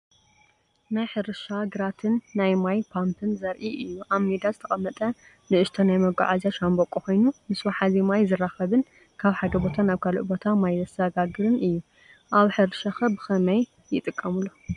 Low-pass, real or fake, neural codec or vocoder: 10.8 kHz; real; none